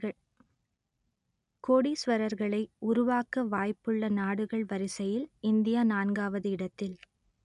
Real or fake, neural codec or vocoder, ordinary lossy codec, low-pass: real; none; none; 10.8 kHz